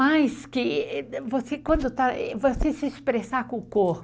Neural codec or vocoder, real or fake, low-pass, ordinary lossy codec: none; real; none; none